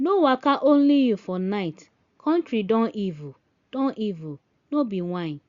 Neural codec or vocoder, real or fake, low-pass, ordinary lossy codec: none; real; 7.2 kHz; Opus, 64 kbps